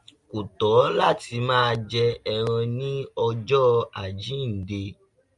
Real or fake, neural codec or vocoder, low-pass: real; none; 10.8 kHz